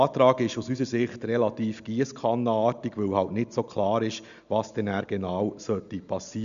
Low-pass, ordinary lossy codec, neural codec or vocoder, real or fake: 7.2 kHz; MP3, 96 kbps; none; real